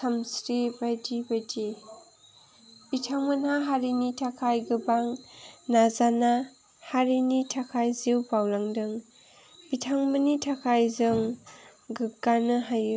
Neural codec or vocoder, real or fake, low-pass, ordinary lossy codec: none; real; none; none